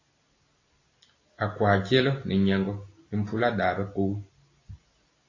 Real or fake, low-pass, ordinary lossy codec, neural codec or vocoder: real; 7.2 kHz; AAC, 32 kbps; none